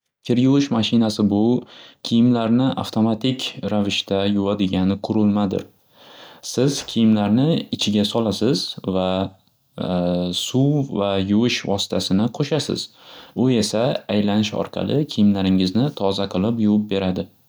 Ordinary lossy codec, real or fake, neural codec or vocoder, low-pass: none; real; none; none